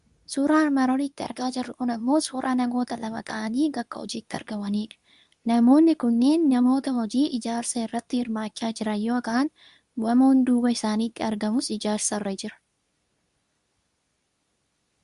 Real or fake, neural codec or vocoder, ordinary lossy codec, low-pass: fake; codec, 24 kHz, 0.9 kbps, WavTokenizer, medium speech release version 2; Opus, 64 kbps; 10.8 kHz